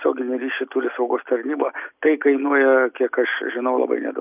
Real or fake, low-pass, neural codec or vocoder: real; 3.6 kHz; none